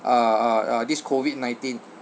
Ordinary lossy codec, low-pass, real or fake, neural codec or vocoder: none; none; real; none